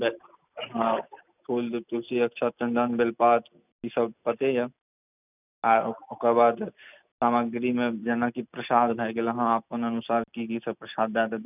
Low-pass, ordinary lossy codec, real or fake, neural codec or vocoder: 3.6 kHz; none; real; none